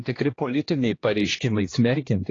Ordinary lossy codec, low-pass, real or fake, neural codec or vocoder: AAC, 32 kbps; 7.2 kHz; fake; codec, 16 kHz, 1 kbps, X-Codec, HuBERT features, trained on general audio